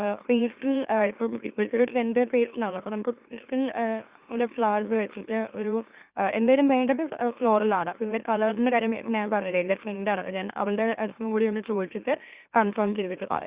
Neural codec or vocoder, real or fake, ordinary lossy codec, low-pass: autoencoder, 44.1 kHz, a latent of 192 numbers a frame, MeloTTS; fake; Opus, 64 kbps; 3.6 kHz